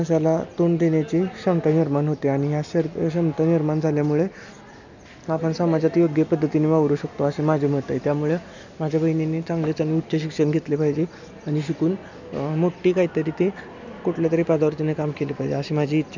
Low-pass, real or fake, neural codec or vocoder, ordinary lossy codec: 7.2 kHz; real; none; none